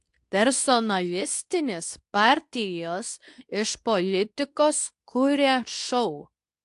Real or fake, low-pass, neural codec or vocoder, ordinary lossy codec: fake; 10.8 kHz; codec, 24 kHz, 0.9 kbps, WavTokenizer, medium speech release version 2; AAC, 64 kbps